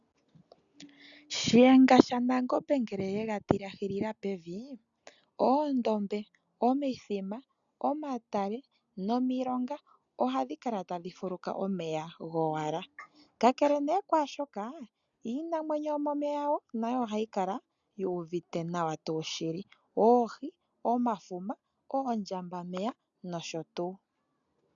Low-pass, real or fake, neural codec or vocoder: 7.2 kHz; real; none